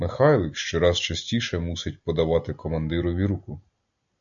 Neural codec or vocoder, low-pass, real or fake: none; 7.2 kHz; real